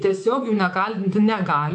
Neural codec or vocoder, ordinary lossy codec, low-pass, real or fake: codec, 24 kHz, 3.1 kbps, DualCodec; MP3, 64 kbps; 10.8 kHz; fake